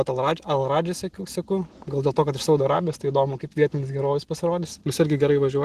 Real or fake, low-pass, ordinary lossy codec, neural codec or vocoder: real; 14.4 kHz; Opus, 16 kbps; none